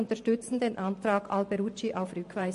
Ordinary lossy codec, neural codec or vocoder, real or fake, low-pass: MP3, 48 kbps; vocoder, 44.1 kHz, 128 mel bands every 512 samples, BigVGAN v2; fake; 14.4 kHz